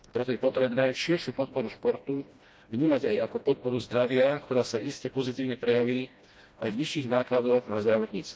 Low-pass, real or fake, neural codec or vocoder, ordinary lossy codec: none; fake; codec, 16 kHz, 1 kbps, FreqCodec, smaller model; none